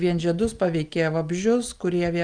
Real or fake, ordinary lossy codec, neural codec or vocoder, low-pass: real; Opus, 32 kbps; none; 9.9 kHz